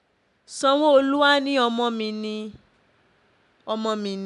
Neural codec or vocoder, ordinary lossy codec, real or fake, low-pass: none; none; real; 10.8 kHz